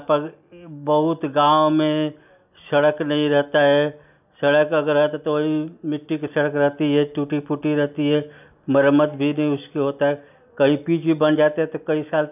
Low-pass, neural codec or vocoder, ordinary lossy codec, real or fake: 3.6 kHz; none; none; real